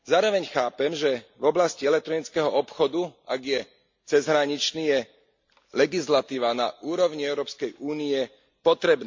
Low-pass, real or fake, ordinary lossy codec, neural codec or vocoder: 7.2 kHz; real; MP3, 48 kbps; none